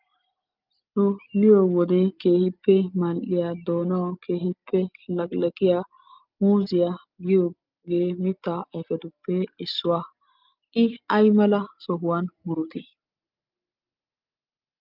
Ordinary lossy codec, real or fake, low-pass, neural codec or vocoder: Opus, 24 kbps; real; 5.4 kHz; none